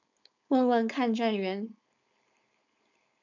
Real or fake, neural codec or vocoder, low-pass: fake; codec, 16 kHz, 4.8 kbps, FACodec; 7.2 kHz